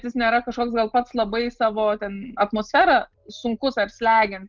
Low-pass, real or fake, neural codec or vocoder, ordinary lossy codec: 7.2 kHz; real; none; Opus, 32 kbps